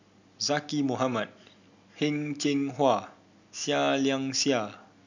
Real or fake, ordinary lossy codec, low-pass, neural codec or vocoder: real; none; 7.2 kHz; none